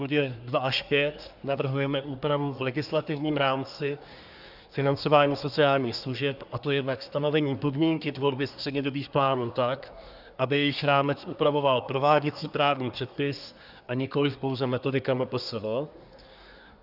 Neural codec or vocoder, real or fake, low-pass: codec, 24 kHz, 1 kbps, SNAC; fake; 5.4 kHz